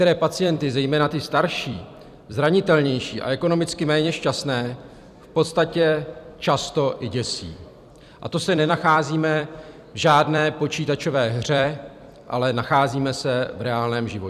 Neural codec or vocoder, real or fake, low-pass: vocoder, 44.1 kHz, 128 mel bands every 256 samples, BigVGAN v2; fake; 14.4 kHz